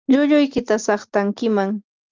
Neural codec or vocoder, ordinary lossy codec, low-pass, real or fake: none; Opus, 24 kbps; 7.2 kHz; real